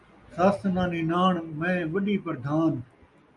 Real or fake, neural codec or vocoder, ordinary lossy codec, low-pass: real; none; MP3, 96 kbps; 10.8 kHz